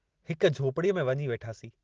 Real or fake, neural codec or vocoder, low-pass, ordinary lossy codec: real; none; 7.2 kHz; Opus, 16 kbps